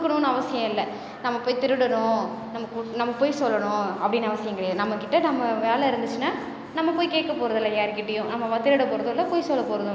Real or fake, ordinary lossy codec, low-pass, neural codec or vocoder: real; none; none; none